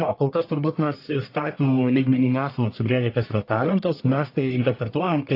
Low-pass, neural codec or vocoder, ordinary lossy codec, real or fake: 5.4 kHz; codec, 44.1 kHz, 1.7 kbps, Pupu-Codec; AAC, 32 kbps; fake